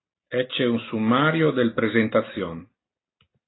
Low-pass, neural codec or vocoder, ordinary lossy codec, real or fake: 7.2 kHz; none; AAC, 16 kbps; real